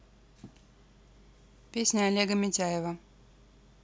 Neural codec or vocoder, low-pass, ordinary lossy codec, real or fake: none; none; none; real